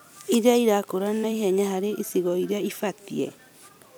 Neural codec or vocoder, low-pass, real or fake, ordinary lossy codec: vocoder, 44.1 kHz, 128 mel bands every 256 samples, BigVGAN v2; none; fake; none